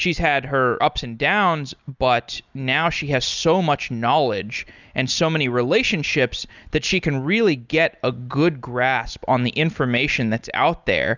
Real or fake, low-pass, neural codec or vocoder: real; 7.2 kHz; none